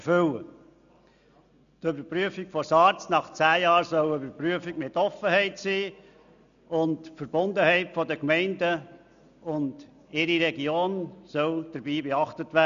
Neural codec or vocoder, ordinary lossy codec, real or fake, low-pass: none; none; real; 7.2 kHz